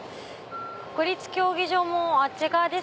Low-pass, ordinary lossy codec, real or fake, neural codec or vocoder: none; none; real; none